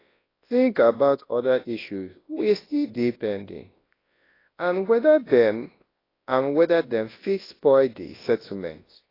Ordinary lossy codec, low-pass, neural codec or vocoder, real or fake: AAC, 24 kbps; 5.4 kHz; codec, 24 kHz, 0.9 kbps, WavTokenizer, large speech release; fake